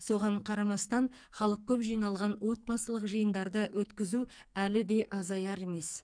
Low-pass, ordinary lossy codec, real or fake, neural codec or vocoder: 9.9 kHz; none; fake; codec, 44.1 kHz, 2.6 kbps, SNAC